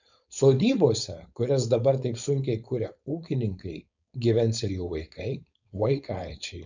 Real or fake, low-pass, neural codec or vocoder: fake; 7.2 kHz; codec, 16 kHz, 4.8 kbps, FACodec